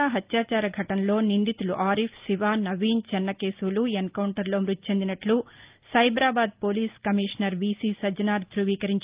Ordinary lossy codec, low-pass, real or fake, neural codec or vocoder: Opus, 32 kbps; 3.6 kHz; real; none